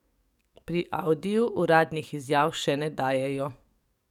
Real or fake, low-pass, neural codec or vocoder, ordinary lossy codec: fake; 19.8 kHz; codec, 44.1 kHz, 7.8 kbps, DAC; none